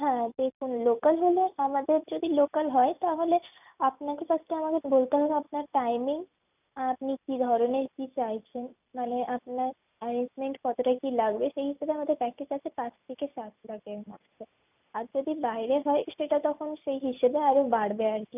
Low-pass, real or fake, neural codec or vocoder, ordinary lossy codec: 3.6 kHz; real; none; none